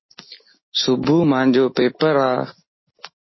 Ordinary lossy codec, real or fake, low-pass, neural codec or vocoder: MP3, 24 kbps; real; 7.2 kHz; none